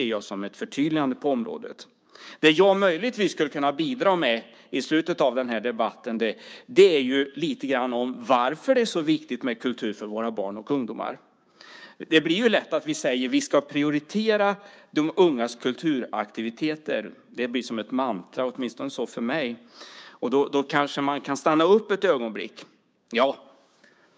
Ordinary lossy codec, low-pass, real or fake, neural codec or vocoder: none; none; fake; codec, 16 kHz, 6 kbps, DAC